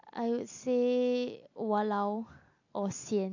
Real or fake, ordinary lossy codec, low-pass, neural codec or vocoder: real; AAC, 48 kbps; 7.2 kHz; none